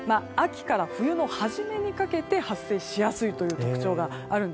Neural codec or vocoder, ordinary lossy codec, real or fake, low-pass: none; none; real; none